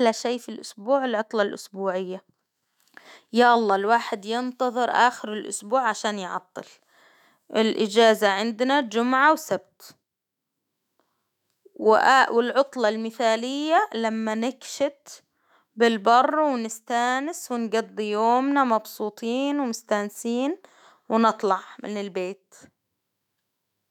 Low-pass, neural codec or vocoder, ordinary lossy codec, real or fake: 19.8 kHz; autoencoder, 48 kHz, 128 numbers a frame, DAC-VAE, trained on Japanese speech; none; fake